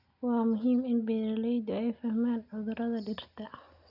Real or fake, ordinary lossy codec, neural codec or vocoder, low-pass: real; none; none; 5.4 kHz